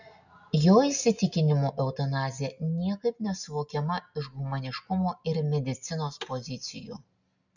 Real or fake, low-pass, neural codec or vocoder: real; 7.2 kHz; none